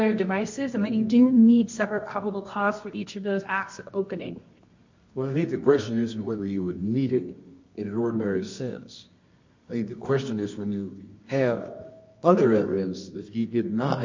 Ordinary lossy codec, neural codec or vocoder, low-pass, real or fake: MP3, 48 kbps; codec, 24 kHz, 0.9 kbps, WavTokenizer, medium music audio release; 7.2 kHz; fake